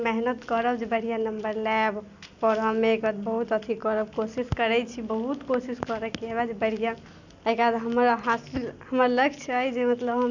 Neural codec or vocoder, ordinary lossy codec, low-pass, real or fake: none; none; 7.2 kHz; real